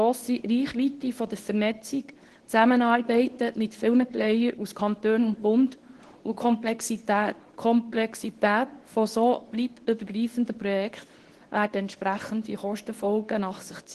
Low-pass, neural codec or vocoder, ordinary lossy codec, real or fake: 10.8 kHz; codec, 24 kHz, 0.9 kbps, WavTokenizer, medium speech release version 2; Opus, 16 kbps; fake